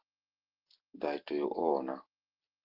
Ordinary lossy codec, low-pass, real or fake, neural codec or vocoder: Opus, 16 kbps; 5.4 kHz; real; none